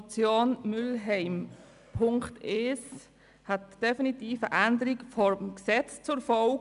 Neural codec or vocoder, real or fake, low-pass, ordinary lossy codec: none; real; 10.8 kHz; none